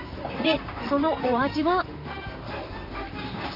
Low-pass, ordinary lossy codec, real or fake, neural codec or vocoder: 5.4 kHz; none; fake; vocoder, 44.1 kHz, 128 mel bands, Pupu-Vocoder